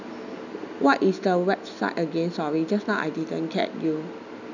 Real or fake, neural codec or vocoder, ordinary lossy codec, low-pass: real; none; none; 7.2 kHz